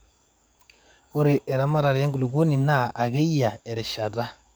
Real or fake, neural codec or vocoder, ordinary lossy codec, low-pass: fake; codec, 44.1 kHz, 7.8 kbps, DAC; none; none